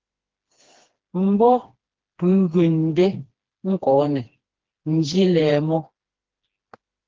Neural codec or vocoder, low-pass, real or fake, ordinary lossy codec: codec, 16 kHz, 2 kbps, FreqCodec, smaller model; 7.2 kHz; fake; Opus, 16 kbps